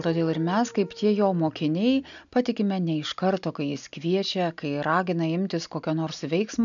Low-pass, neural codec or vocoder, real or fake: 7.2 kHz; none; real